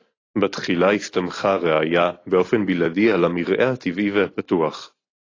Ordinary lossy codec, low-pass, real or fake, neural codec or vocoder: AAC, 32 kbps; 7.2 kHz; real; none